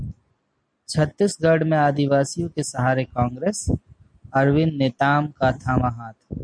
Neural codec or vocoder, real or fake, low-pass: none; real; 9.9 kHz